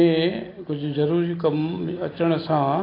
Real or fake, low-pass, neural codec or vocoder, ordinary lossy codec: real; 5.4 kHz; none; AAC, 24 kbps